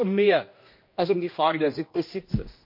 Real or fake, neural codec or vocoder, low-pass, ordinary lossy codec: fake; codec, 16 kHz, 1 kbps, X-Codec, HuBERT features, trained on general audio; 5.4 kHz; MP3, 32 kbps